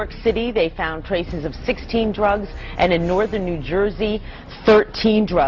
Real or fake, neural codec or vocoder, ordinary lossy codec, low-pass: real; none; Opus, 64 kbps; 7.2 kHz